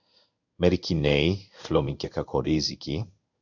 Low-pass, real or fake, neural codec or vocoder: 7.2 kHz; fake; codec, 16 kHz in and 24 kHz out, 1 kbps, XY-Tokenizer